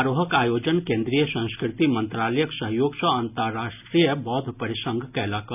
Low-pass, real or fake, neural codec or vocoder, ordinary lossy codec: 3.6 kHz; real; none; none